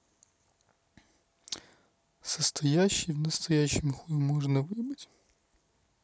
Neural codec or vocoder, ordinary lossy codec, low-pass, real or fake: none; none; none; real